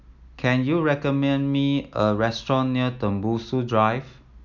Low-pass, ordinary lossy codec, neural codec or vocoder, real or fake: 7.2 kHz; none; none; real